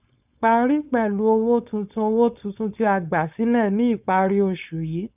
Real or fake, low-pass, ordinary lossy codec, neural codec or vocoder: fake; 3.6 kHz; none; codec, 16 kHz, 4.8 kbps, FACodec